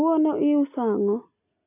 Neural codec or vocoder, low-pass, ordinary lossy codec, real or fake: none; 3.6 kHz; none; real